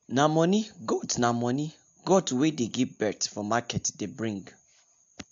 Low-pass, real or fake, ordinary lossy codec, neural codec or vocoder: 7.2 kHz; real; AAC, 64 kbps; none